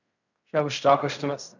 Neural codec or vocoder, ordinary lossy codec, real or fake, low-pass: codec, 16 kHz in and 24 kHz out, 0.4 kbps, LongCat-Audio-Codec, fine tuned four codebook decoder; none; fake; 7.2 kHz